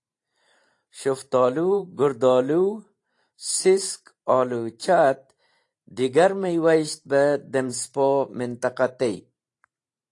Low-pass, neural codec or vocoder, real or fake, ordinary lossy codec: 10.8 kHz; vocoder, 44.1 kHz, 128 mel bands every 512 samples, BigVGAN v2; fake; AAC, 48 kbps